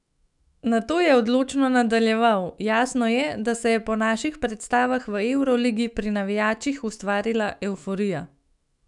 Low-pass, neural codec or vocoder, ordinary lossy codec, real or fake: 10.8 kHz; autoencoder, 48 kHz, 128 numbers a frame, DAC-VAE, trained on Japanese speech; none; fake